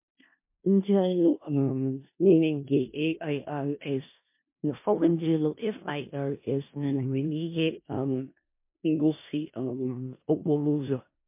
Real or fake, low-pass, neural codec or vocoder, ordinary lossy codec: fake; 3.6 kHz; codec, 16 kHz in and 24 kHz out, 0.4 kbps, LongCat-Audio-Codec, four codebook decoder; MP3, 24 kbps